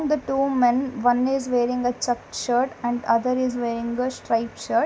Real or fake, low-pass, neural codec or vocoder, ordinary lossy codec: real; none; none; none